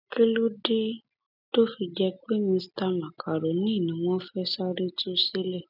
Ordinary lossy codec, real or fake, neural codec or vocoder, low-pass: none; real; none; 5.4 kHz